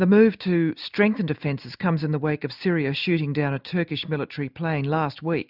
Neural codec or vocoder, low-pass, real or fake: none; 5.4 kHz; real